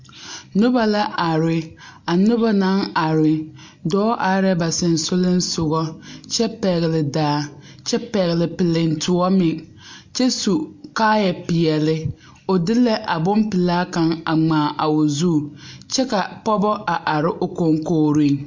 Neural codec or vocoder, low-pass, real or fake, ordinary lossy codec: none; 7.2 kHz; real; MP3, 48 kbps